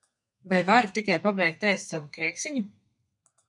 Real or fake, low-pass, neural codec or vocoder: fake; 10.8 kHz; codec, 32 kHz, 1.9 kbps, SNAC